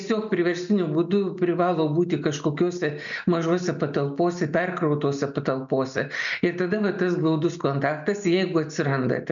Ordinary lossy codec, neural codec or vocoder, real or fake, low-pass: MP3, 96 kbps; none; real; 7.2 kHz